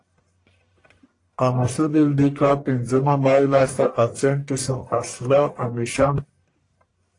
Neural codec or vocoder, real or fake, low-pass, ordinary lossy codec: codec, 44.1 kHz, 1.7 kbps, Pupu-Codec; fake; 10.8 kHz; AAC, 48 kbps